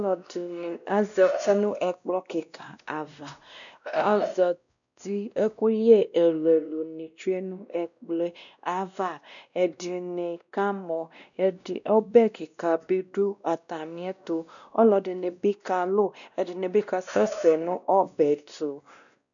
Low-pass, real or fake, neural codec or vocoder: 7.2 kHz; fake; codec, 16 kHz, 1 kbps, X-Codec, WavLM features, trained on Multilingual LibriSpeech